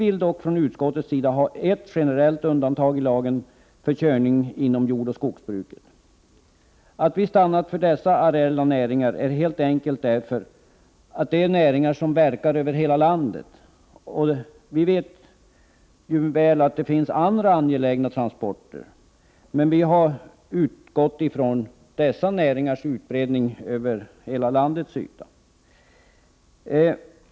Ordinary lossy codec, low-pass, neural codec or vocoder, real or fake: none; none; none; real